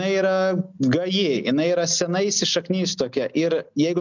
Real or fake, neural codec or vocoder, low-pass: real; none; 7.2 kHz